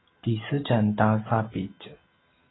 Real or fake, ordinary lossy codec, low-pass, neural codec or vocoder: real; AAC, 16 kbps; 7.2 kHz; none